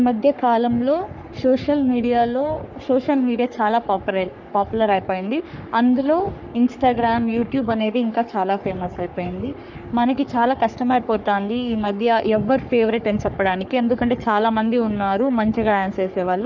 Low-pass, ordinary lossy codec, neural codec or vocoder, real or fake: 7.2 kHz; none; codec, 44.1 kHz, 3.4 kbps, Pupu-Codec; fake